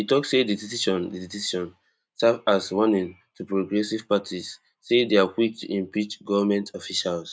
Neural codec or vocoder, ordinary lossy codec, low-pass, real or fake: none; none; none; real